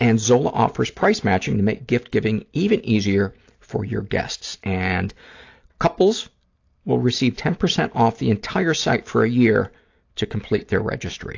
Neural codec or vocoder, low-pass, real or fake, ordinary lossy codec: none; 7.2 kHz; real; AAC, 48 kbps